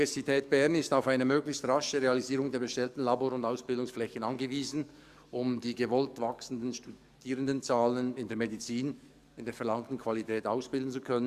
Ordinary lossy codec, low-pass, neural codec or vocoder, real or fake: Opus, 64 kbps; 14.4 kHz; codec, 44.1 kHz, 7.8 kbps, DAC; fake